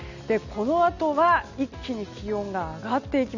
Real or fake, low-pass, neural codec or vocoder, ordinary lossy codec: real; 7.2 kHz; none; none